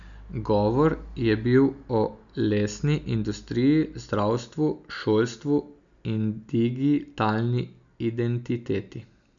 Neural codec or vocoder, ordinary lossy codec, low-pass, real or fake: none; none; 7.2 kHz; real